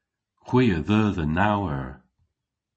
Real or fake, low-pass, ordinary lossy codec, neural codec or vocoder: real; 9.9 kHz; MP3, 32 kbps; none